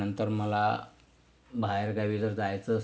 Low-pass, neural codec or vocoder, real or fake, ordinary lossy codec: none; none; real; none